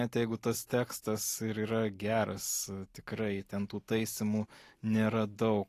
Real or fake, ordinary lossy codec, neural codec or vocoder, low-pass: real; AAC, 48 kbps; none; 14.4 kHz